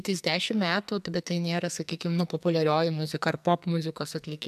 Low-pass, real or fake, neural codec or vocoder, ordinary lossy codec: 14.4 kHz; fake; codec, 44.1 kHz, 2.6 kbps, SNAC; MP3, 96 kbps